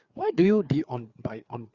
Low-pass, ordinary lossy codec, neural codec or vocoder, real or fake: 7.2 kHz; Opus, 64 kbps; codec, 16 kHz, 4 kbps, FreqCodec, larger model; fake